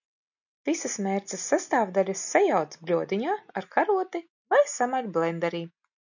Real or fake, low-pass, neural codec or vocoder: real; 7.2 kHz; none